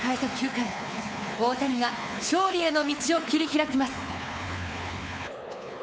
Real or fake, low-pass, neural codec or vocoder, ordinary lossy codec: fake; none; codec, 16 kHz, 4 kbps, X-Codec, WavLM features, trained on Multilingual LibriSpeech; none